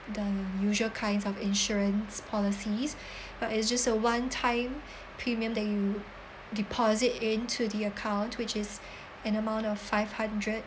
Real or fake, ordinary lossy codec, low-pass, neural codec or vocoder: real; none; none; none